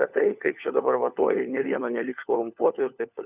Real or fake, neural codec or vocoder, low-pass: fake; codec, 16 kHz, 4 kbps, FunCodec, trained on LibriTTS, 50 frames a second; 3.6 kHz